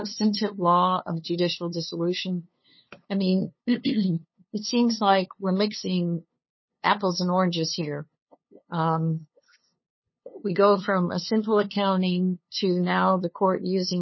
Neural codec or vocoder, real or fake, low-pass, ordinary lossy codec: codec, 16 kHz, 2 kbps, FunCodec, trained on LibriTTS, 25 frames a second; fake; 7.2 kHz; MP3, 24 kbps